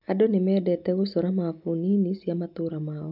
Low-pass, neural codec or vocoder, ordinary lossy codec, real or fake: 5.4 kHz; none; none; real